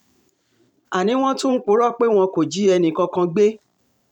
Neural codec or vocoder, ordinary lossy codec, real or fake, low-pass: vocoder, 44.1 kHz, 128 mel bands every 512 samples, BigVGAN v2; none; fake; 19.8 kHz